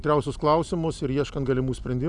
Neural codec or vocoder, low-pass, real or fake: none; 10.8 kHz; real